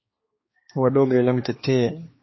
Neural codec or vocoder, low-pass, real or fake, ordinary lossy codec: codec, 16 kHz, 2 kbps, X-Codec, HuBERT features, trained on balanced general audio; 7.2 kHz; fake; MP3, 24 kbps